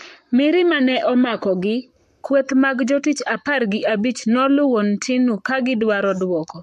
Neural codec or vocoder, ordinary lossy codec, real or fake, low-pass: codec, 44.1 kHz, 7.8 kbps, Pupu-Codec; MP3, 64 kbps; fake; 14.4 kHz